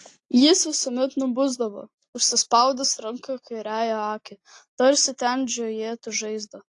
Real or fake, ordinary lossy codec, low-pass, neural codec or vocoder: real; AAC, 48 kbps; 10.8 kHz; none